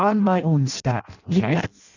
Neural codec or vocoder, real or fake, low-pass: codec, 16 kHz in and 24 kHz out, 0.6 kbps, FireRedTTS-2 codec; fake; 7.2 kHz